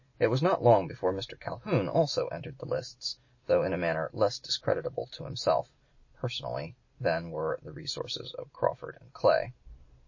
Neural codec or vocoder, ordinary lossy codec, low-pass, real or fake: none; MP3, 32 kbps; 7.2 kHz; real